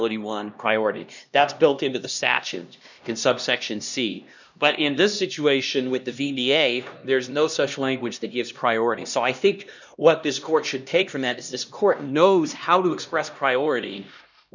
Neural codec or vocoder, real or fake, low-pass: codec, 16 kHz, 1 kbps, X-Codec, HuBERT features, trained on LibriSpeech; fake; 7.2 kHz